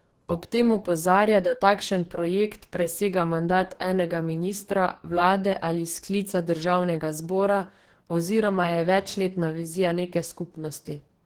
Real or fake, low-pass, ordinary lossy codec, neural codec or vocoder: fake; 19.8 kHz; Opus, 16 kbps; codec, 44.1 kHz, 2.6 kbps, DAC